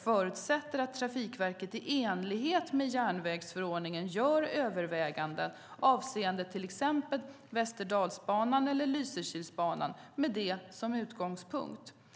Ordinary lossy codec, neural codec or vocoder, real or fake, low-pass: none; none; real; none